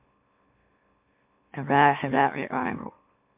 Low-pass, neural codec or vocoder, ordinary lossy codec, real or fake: 3.6 kHz; autoencoder, 44.1 kHz, a latent of 192 numbers a frame, MeloTTS; MP3, 32 kbps; fake